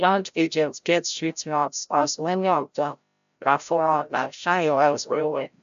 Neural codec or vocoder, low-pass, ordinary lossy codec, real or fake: codec, 16 kHz, 0.5 kbps, FreqCodec, larger model; 7.2 kHz; AAC, 96 kbps; fake